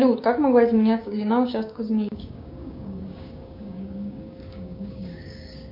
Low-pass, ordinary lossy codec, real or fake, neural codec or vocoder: 5.4 kHz; MP3, 48 kbps; fake; codec, 16 kHz, 6 kbps, DAC